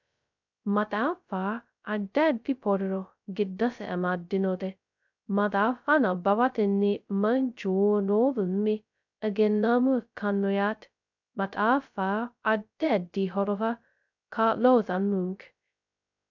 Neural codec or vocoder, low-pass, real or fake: codec, 16 kHz, 0.2 kbps, FocalCodec; 7.2 kHz; fake